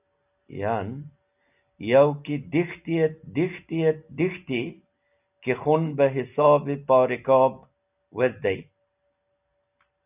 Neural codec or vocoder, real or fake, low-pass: none; real; 3.6 kHz